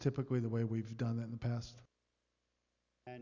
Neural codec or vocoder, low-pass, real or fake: none; 7.2 kHz; real